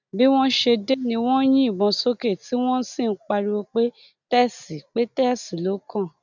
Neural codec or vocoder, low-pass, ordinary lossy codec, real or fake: none; 7.2 kHz; none; real